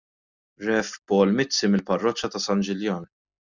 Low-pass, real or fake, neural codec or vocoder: 7.2 kHz; real; none